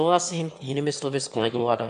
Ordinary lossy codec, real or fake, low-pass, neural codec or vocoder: AAC, 64 kbps; fake; 9.9 kHz; autoencoder, 22.05 kHz, a latent of 192 numbers a frame, VITS, trained on one speaker